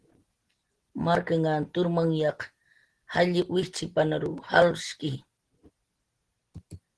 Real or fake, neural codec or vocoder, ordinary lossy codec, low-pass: real; none; Opus, 16 kbps; 10.8 kHz